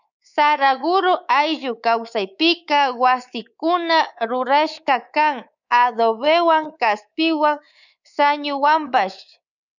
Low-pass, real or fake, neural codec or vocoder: 7.2 kHz; fake; codec, 24 kHz, 3.1 kbps, DualCodec